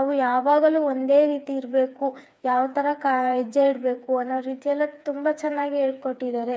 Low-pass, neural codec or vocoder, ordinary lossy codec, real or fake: none; codec, 16 kHz, 4 kbps, FreqCodec, smaller model; none; fake